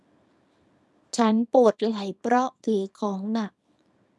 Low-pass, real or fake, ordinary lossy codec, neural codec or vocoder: none; fake; none; codec, 24 kHz, 0.9 kbps, WavTokenizer, small release